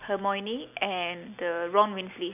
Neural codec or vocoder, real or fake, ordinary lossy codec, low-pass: none; real; none; 3.6 kHz